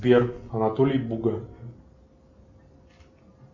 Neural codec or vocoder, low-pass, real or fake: none; 7.2 kHz; real